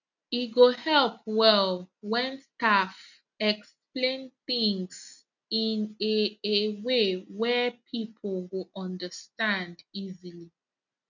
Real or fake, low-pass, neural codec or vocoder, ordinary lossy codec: real; 7.2 kHz; none; none